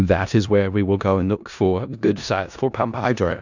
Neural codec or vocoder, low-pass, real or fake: codec, 16 kHz in and 24 kHz out, 0.4 kbps, LongCat-Audio-Codec, four codebook decoder; 7.2 kHz; fake